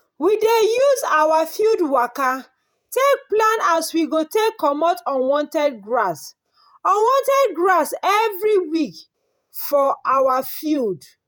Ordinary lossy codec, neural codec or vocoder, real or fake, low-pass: none; vocoder, 48 kHz, 128 mel bands, Vocos; fake; none